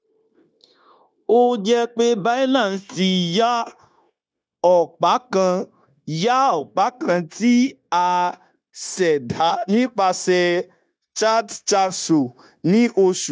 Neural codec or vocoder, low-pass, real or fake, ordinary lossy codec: codec, 16 kHz, 0.9 kbps, LongCat-Audio-Codec; none; fake; none